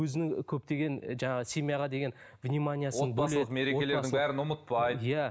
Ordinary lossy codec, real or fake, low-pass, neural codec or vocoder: none; real; none; none